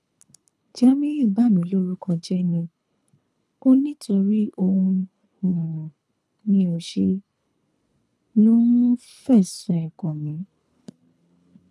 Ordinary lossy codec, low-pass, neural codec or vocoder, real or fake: none; none; codec, 24 kHz, 3 kbps, HILCodec; fake